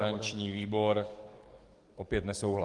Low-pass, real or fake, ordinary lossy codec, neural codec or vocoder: 10.8 kHz; fake; Opus, 24 kbps; codec, 44.1 kHz, 7.8 kbps, DAC